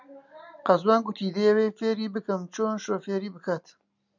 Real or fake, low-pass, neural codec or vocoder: real; 7.2 kHz; none